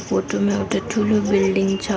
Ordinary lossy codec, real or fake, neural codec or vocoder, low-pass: none; real; none; none